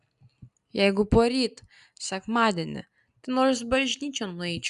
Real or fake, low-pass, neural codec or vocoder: real; 10.8 kHz; none